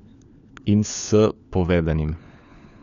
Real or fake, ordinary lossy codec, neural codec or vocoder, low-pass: fake; none; codec, 16 kHz, 4 kbps, FunCodec, trained on LibriTTS, 50 frames a second; 7.2 kHz